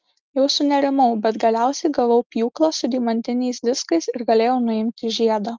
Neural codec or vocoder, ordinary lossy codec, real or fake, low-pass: none; Opus, 24 kbps; real; 7.2 kHz